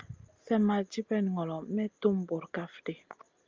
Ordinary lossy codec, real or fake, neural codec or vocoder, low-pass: Opus, 32 kbps; real; none; 7.2 kHz